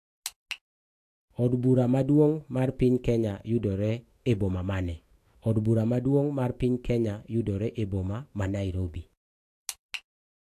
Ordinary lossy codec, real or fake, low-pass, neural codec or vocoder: AAC, 64 kbps; fake; 14.4 kHz; autoencoder, 48 kHz, 128 numbers a frame, DAC-VAE, trained on Japanese speech